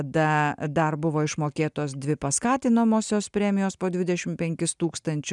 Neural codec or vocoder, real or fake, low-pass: none; real; 10.8 kHz